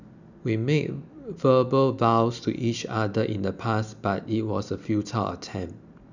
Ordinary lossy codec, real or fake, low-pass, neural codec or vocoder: none; real; 7.2 kHz; none